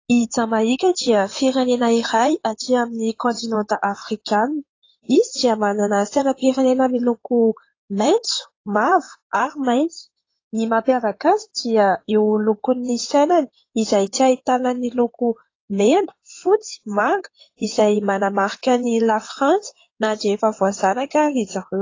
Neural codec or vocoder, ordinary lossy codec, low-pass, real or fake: codec, 16 kHz in and 24 kHz out, 2.2 kbps, FireRedTTS-2 codec; AAC, 32 kbps; 7.2 kHz; fake